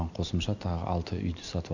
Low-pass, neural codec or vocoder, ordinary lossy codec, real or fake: 7.2 kHz; none; none; real